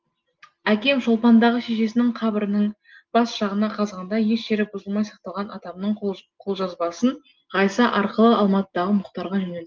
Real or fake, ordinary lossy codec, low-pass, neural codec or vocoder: real; Opus, 32 kbps; 7.2 kHz; none